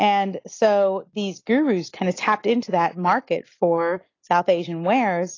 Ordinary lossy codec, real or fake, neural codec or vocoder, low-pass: AAC, 32 kbps; real; none; 7.2 kHz